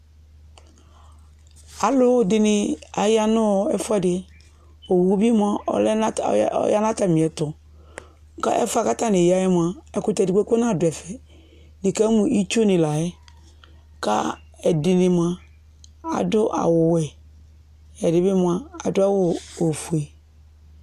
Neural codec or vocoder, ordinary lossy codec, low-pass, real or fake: none; MP3, 96 kbps; 14.4 kHz; real